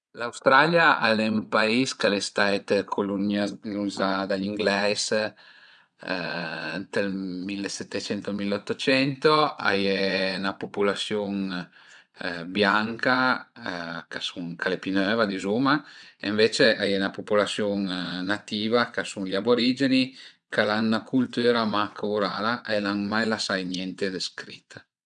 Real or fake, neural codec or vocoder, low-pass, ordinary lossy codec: fake; vocoder, 22.05 kHz, 80 mel bands, WaveNeXt; 9.9 kHz; none